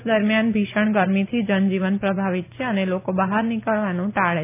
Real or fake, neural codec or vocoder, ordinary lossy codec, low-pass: real; none; none; 3.6 kHz